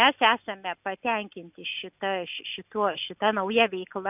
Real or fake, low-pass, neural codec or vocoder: real; 3.6 kHz; none